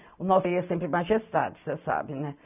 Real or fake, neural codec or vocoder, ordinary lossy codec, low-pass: real; none; none; 3.6 kHz